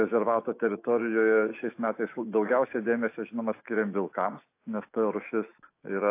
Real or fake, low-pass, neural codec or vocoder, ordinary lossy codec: fake; 3.6 kHz; autoencoder, 48 kHz, 128 numbers a frame, DAC-VAE, trained on Japanese speech; AAC, 24 kbps